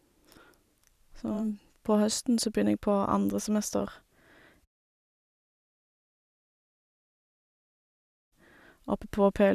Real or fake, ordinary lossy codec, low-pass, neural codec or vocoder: fake; none; 14.4 kHz; vocoder, 44.1 kHz, 128 mel bands every 512 samples, BigVGAN v2